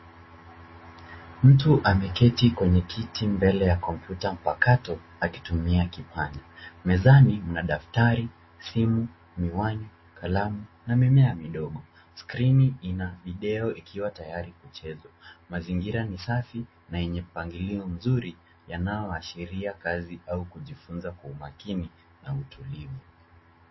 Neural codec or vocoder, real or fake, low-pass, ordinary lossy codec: none; real; 7.2 kHz; MP3, 24 kbps